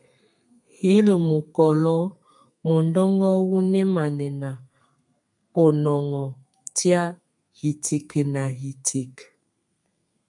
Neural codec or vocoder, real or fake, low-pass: codec, 32 kHz, 1.9 kbps, SNAC; fake; 10.8 kHz